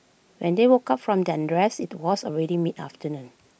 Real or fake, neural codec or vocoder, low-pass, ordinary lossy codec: real; none; none; none